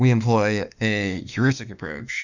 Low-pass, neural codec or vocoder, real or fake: 7.2 kHz; autoencoder, 48 kHz, 32 numbers a frame, DAC-VAE, trained on Japanese speech; fake